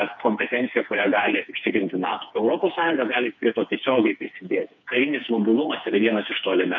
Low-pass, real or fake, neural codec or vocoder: 7.2 kHz; fake; codec, 16 kHz, 4 kbps, FreqCodec, smaller model